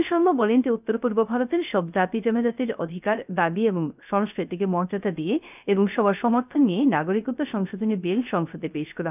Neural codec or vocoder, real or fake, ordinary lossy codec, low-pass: codec, 16 kHz, 0.3 kbps, FocalCodec; fake; none; 3.6 kHz